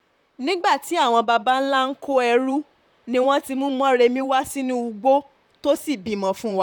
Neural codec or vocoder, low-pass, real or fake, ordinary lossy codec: vocoder, 44.1 kHz, 128 mel bands, Pupu-Vocoder; 19.8 kHz; fake; none